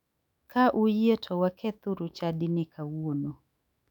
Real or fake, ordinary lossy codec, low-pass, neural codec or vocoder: fake; none; 19.8 kHz; autoencoder, 48 kHz, 128 numbers a frame, DAC-VAE, trained on Japanese speech